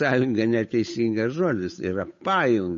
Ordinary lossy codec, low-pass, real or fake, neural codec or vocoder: MP3, 32 kbps; 7.2 kHz; fake; codec, 16 kHz, 8 kbps, FunCodec, trained on Chinese and English, 25 frames a second